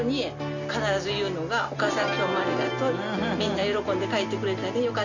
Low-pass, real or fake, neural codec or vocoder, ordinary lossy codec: 7.2 kHz; real; none; none